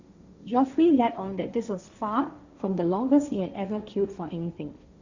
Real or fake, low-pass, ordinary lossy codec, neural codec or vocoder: fake; 7.2 kHz; Opus, 64 kbps; codec, 16 kHz, 1.1 kbps, Voila-Tokenizer